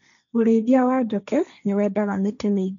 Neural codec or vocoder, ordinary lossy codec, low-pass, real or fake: codec, 16 kHz, 1.1 kbps, Voila-Tokenizer; none; 7.2 kHz; fake